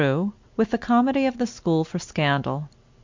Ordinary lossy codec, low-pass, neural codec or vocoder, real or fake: MP3, 64 kbps; 7.2 kHz; none; real